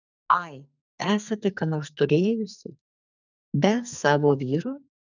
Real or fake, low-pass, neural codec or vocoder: fake; 7.2 kHz; codec, 44.1 kHz, 2.6 kbps, SNAC